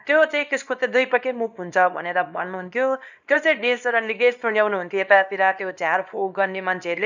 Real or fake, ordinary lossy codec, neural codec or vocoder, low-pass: fake; none; codec, 24 kHz, 0.9 kbps, WavTokenizer, small release; 7.2 kHz